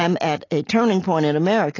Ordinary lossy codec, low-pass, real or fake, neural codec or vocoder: AAC, 32 kbps; 7.2 kHz; real; none